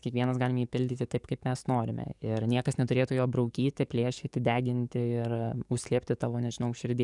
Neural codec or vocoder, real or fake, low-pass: codec, 44.1 kHz, 7.8 kbps, DAC; fake; 10.8 kHz